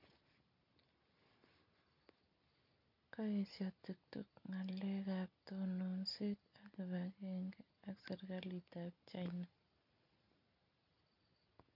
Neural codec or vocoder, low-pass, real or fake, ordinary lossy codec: none; 5.4 kHz; real; none